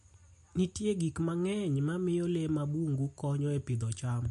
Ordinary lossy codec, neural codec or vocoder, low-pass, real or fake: MP3, 48 kbps; none; 14.4 kHz; real